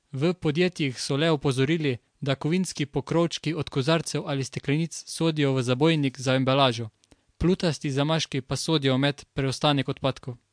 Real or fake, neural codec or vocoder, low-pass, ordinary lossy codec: real; none; 9.9 kHz; MP3, 64 kbps